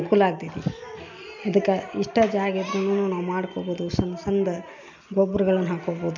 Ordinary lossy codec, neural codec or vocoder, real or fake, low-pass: MP3, 64 kbps; none; real; 7.2 kHz